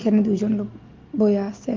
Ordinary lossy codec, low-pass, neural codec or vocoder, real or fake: Opus, 32 kbps; 7.2 kHz; none; real